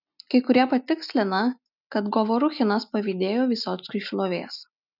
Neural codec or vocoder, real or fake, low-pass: none; real; 5.4 kHz